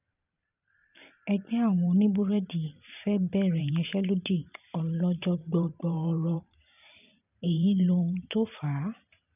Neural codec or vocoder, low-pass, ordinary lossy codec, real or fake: vocoder, 44.1 kHz, 128 mel bands every 512 samples, BigVGAN v2; 3.6 kHz; none; fake